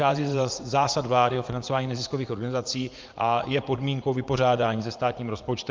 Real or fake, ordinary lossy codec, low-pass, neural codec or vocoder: fake; Opus, 24 kbps; 7.2 kHz; vocoder, 44.1 kHz, 80 mel bands, Vocos